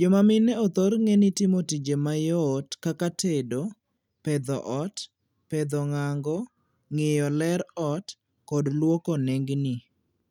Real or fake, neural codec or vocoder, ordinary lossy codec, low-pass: real; none; none; 19.8 kHz